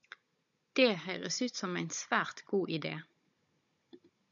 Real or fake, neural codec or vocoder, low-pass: fake; codec, 16 kHz, 16 kbps, FunCodec, trained on Chinese and English, 50 frames a second; 7.2 kHz